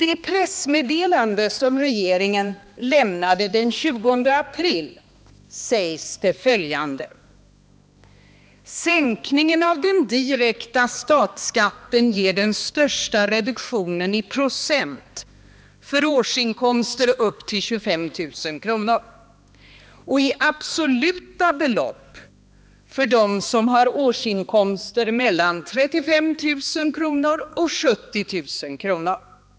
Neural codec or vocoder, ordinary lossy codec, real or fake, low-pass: codec, 16 kHz, 2 kbps, X-Codec, HuBERT features, trained on balanced general audio; none; fake; none